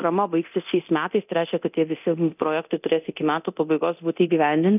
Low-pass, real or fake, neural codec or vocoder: 3.6 kHz; fake; codec, 24 kHz, 0.9 kbps, DualCodec